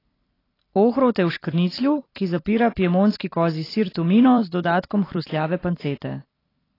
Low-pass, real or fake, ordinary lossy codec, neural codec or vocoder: 5.4 kHz; fake; AAC, 24 kbps; vocoder, 44.1 kHz, 128 mel bands every 256 samples, BigVGAN v2